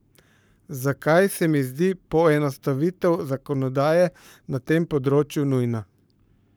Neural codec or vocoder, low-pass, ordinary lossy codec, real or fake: codec, 44.1 kHz, 7.8 kbps, Pupu-Codec; none; none; fake